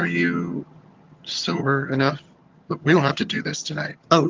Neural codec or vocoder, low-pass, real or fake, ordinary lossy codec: vocoder, 22.05 kHz, 80 mel bands, HiFi-GAN; 7.2 kHz; fake; Opus, 32 kbps